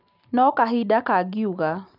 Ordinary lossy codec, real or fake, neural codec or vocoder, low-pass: none; real; none; 5.4 kHz